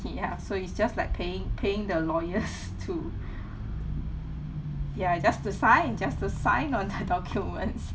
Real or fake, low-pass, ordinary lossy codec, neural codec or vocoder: real; none; none; none